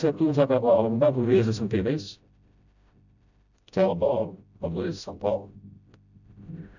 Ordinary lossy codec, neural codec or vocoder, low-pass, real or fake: none; codec, 16 kHz, 0.5 kbps, FreqCodec, smaller model; 7.2 kHz; fake